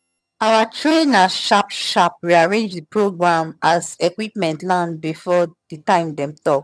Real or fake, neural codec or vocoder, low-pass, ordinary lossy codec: fake; vocoder, 22.05 kHz, 80 mel bands, HiFi-GAN; none; none